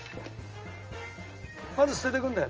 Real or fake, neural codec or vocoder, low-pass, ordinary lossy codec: real; none; 7.2 kHz; Opus, 24 kbps